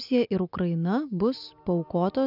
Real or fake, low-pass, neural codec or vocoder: real; 5.4 kHz; none